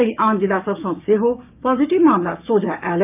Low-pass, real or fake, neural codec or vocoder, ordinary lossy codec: 3.6 kHz; fake; codec, 16 kHz, 6 kbps, DAC; none